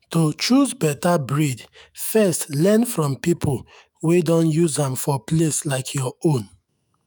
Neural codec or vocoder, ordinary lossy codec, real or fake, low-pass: autoencoder, 48 kHz, 128 numbers a frame, DAC-VAE, trained on Japanese speech; none; fake; none